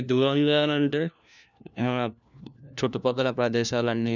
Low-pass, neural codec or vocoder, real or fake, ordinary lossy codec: 7.2 kHz; codec, 16 kHz, 1 kbps, FunCodec, trained on LibriTTS, 50 frames a second; fake; none